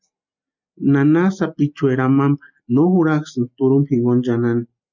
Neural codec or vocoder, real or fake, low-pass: none; real; 7.2 kHz